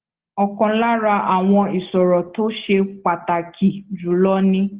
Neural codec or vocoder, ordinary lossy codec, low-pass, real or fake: none; Opus, 16 kbps; 3.6 kHz; real